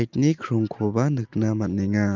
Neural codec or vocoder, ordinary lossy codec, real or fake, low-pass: none; Opus, 24 kbps; real; 7.2 kHz